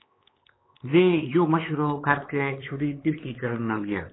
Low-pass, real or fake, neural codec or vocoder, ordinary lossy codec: 7.2 kHz; fake; codec, 16 kHz, 4 kbps, X-Codec, HuBERT features, trained on LibriSpeech; AAC, 16 kbps